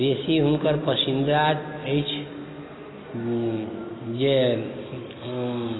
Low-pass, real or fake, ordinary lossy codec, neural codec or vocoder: 7.2 kHz; real; AAC, 16 kbps; none